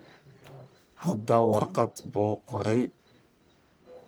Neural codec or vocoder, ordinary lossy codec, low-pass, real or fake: codec, 44.1 kHz, 1.7 kbps, Pupu-Codec; none; none; fake